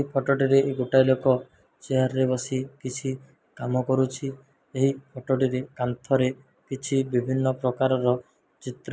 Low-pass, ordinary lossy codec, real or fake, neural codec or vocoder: none; none; real; none